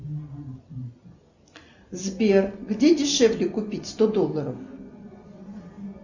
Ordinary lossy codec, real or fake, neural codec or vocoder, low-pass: Opus, 64 kbps; real; none; 7.2 kHz